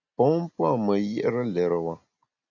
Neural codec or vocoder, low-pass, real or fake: none; 7.2 kHz; real